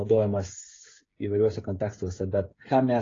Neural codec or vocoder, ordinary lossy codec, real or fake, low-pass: codec, 16 kHz, 8 kbps, FreqCodec, smaller model; AAC, 32 kbps; fake; 7.2 kHz